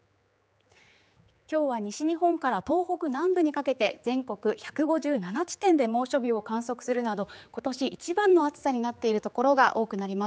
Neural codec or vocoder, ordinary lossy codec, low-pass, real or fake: codec, 16 kHz, 4 kbps, X-Codec, HuBERT features, trained on general audio; none; none; fake